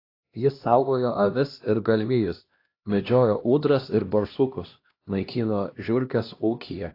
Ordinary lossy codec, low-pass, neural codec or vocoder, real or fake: AAC, 32 kbps; 5.4 kHz; codec, 16 kHz, 1 kbps, X-Codec, HuBERT features, trained on LibriSpeech; fake